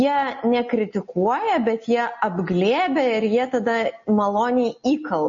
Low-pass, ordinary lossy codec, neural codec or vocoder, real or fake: 7.2 kHz; MP3, 32 kbps; none; real